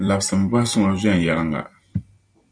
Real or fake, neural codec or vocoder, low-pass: fake; vocoder, 44.1 kHz, 128 mel bands every 256 samples, BigVGAN v2; 9.9 kHz